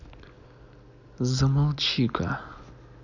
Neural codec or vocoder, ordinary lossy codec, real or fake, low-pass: none; none; real; 7.2 kHz